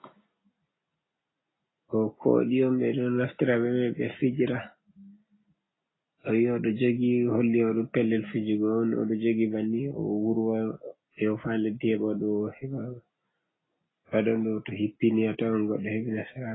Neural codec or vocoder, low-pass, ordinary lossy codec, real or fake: none; 7.2 kHz; AAC, 16 kbps; real